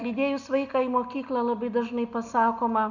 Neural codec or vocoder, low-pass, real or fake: none; 7.2 kHz; real